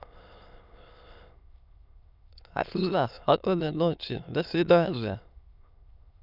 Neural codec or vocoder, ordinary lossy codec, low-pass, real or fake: autoencoder, 22.05 kHz, a latent of 192 numbers a frame, VITS, trained on many speakers; none; 5.4 kHz; fake